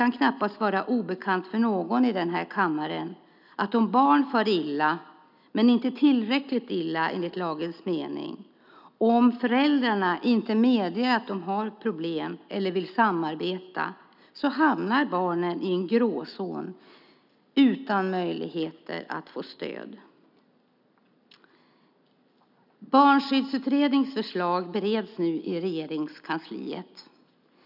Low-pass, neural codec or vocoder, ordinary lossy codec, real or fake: 5.4 kHz; none; none; real